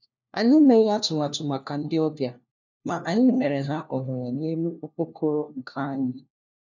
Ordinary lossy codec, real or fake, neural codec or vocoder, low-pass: none; fake; codec, 16 kHz, 1 kbps, FunCodec, trained on LibriTTS, 50 frames a second; 7.2 kHz